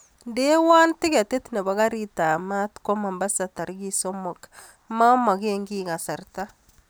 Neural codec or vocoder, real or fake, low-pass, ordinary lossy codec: none; real; none; none